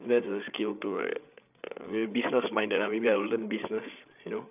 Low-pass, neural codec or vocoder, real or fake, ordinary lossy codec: 3.6 kHz; codec, 16 kHz, 8 kbps, FreqCodec, larger model; fake; none